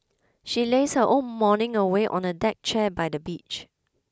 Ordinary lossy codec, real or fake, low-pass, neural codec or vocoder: none; real; none; none